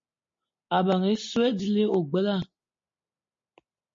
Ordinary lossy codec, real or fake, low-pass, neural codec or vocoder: MP3, 32 kbps; real; 7.2 kHz; none